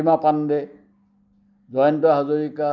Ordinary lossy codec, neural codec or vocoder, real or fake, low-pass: none; none; real; 7.2 kHz